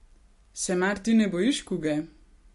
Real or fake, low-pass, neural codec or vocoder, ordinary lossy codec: real; 14.4 kHz; none; MP3, 48 kbps